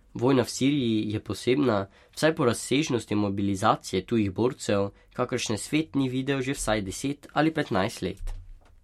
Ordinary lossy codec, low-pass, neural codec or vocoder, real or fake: MP3, 64 kbps; 19.8 kHz; none; real